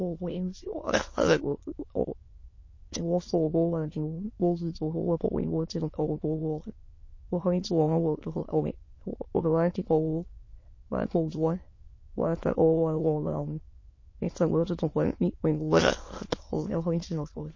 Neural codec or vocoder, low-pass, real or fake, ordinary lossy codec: autoencoder, 22.05 kHz, a latent of 192 numbers a frame, VITS, trained on many speakers; 7.2 kHz; fake; MP3, 32 kbps